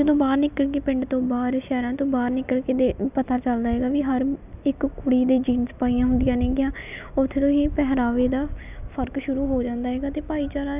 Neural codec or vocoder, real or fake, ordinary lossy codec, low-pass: none; real; none; 3.6 kHz